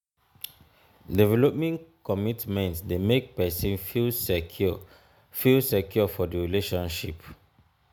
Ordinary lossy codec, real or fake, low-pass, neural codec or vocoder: none; real; none; none